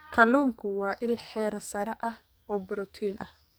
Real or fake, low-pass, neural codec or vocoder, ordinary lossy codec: fake; none; codec, 44.1 kHz, 2.6 kbps, SNAC; none